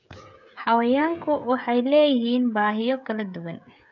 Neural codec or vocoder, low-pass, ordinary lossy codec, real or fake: codec, 16 kHz, 16 kbps, FreqCodec, smaller model; 7.2 kHz; none; fake